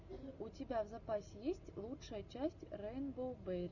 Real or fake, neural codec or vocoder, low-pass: real; none; 7.2 kHz